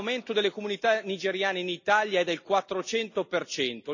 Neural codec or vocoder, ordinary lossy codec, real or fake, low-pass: none; none; real; 7.2 kHz